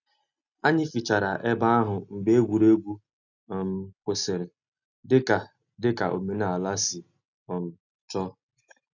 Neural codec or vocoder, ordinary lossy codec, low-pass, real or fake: none; none; 7.2 kHz; real